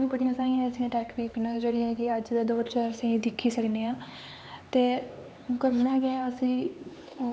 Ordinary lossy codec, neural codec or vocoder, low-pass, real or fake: none; codec, 16 kHz, 4 kbps, X-Codec, HuBERT features, trained on LibriSpeech; none; fake